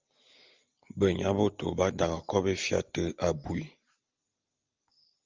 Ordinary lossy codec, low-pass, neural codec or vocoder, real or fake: Opus, 32 kbps; 7.2 kHz; none; real